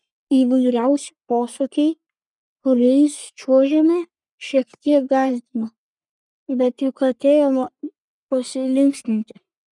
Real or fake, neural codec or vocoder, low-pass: fake; codec, 44.1 kHz, 3.4 kbps, Pupu-Codec; 10.8 kHz